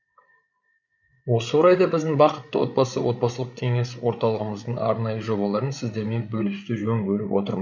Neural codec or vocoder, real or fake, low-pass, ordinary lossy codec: codec, 16 kHz, 8 kbps, FreqCodec, larger model; fake; 7.2 kHz; none